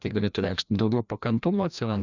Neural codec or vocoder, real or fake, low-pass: codec, 16 kHz, 1 kbps, FreqCodec, larger model; fake; 7.2 kHz